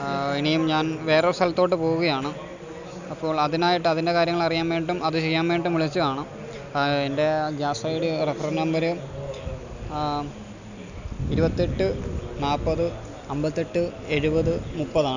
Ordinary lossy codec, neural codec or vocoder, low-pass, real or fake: none; none; 7.2 kHz; real